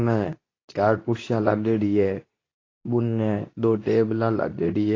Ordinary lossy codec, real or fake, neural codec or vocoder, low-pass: AAC, 32 kbps; fake; codec, 24 kHz, 0.9 kbps, WavTokenizer, medium speech release version 2; 7.2 kHz